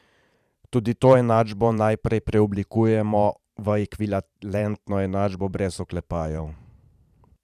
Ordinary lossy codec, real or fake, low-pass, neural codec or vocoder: none; fake; 14.4 kHz; vocoder, 44.1 kHz, 128 mel bands every 512 samples, BigVGAN v2